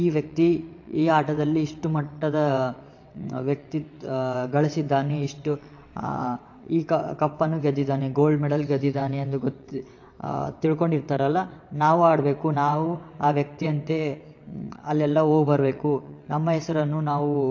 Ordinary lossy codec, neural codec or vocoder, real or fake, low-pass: AAC, 48 kbps; vocoder, 22.05 kHz, 80 mel bands, WaveNeXt; fake; 7.2 kHz